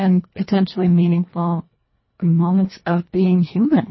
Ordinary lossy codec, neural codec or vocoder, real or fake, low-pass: MP3, 24 kbps; codec, 24 kHz, 1.5 kbps, HILCodec; fake; 7.2 kHz